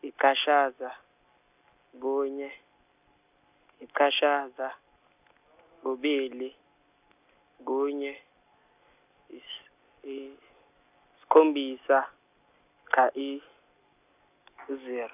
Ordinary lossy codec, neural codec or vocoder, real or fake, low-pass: none; none; real; 3.6 kHz